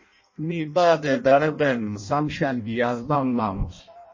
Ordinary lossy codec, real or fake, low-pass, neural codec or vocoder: MP3, 32 kbps; fake; 7.2 kHz; codec, 16 kHz in and 24 kHz out, 0.6 kbps, FireRedTTS-2 codec